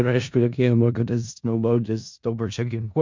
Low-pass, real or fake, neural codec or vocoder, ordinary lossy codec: 7.2 kHz; fake; codec, 16 kHz in and 24 kHz out, 0.4 kbps, LongCat-Audio-Codec, four codebook decoder; MP3, 64 kbps